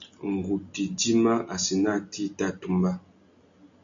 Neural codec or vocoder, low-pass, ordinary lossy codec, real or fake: none; 7.2 kHz; AAC, 64 kbps; real